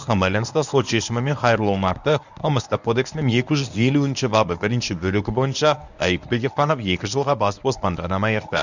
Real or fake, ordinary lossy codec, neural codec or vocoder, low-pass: fake; none; codec, 24 kHz, 0.9 kbps, WavTokenizer, medium speech release version 1; 7.2 kHz